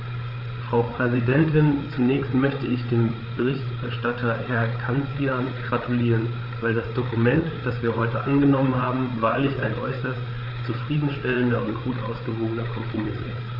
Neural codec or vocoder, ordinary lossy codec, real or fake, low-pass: codec, 16 kHz, 8 kbps, FreqCodec, larger model; none; fake; 5.4 kHz